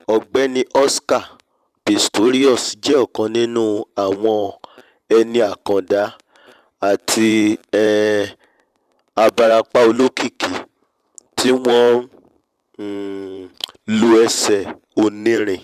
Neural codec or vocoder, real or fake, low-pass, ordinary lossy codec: vocoder, 44.1 kHz, 128 mel bands every 512 samples, BigVGAN v2; fake; 14.4 kHz; none